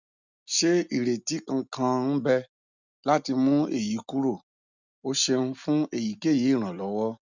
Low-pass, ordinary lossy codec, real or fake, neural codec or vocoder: 7.2 kHz; none; real; none